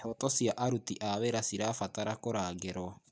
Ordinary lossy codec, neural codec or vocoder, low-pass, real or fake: none; none; none; real